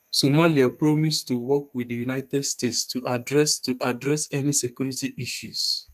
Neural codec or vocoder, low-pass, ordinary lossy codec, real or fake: codec, 32 kHz, 1.9 kbps, SNAC; 14.4 kHz; none; fake